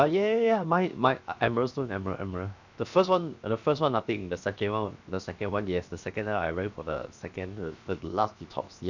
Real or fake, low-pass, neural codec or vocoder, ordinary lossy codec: fake; 7.2 kHz; codec, 16 kHz, 0.7 kbps, FocalCodec; none